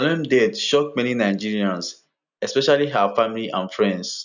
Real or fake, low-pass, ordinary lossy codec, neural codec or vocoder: real; 7.2 kHz; none; none